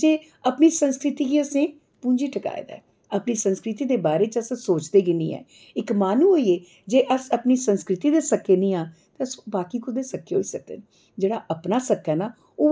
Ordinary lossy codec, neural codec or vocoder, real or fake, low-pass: none; none; real; none